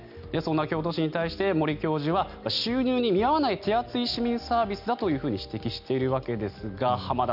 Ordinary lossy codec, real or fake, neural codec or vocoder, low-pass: none; real; none; 5.4 kHz